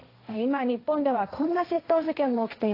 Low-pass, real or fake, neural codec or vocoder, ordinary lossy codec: 5.4 kHz; fake; codec, 16 kHz, 1.1 kbps, Voila-Tokenizer; AAC, 32 kbps